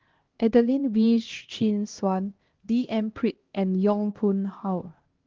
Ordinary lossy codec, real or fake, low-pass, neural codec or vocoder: Opus, 16 kbps; fake; 7.2 kHz; codec, 16 kHz, 1 kbps, X-Codec, HuBERT features, trained on LibriSpeech